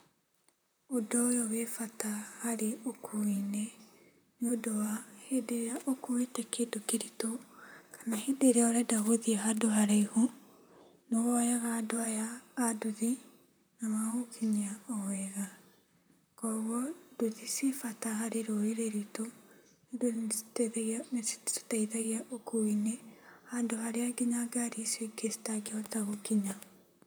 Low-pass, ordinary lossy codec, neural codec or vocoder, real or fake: none; none; vocoder, 44.1 kHz, 128 mel bands, Pupu-Vocoder; fake